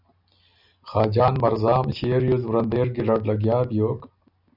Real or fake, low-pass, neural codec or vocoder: real; 5.4 kHz; none